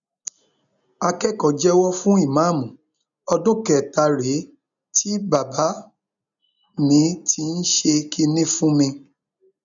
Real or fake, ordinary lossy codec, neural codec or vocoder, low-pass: real; none; none; 7.2 kHz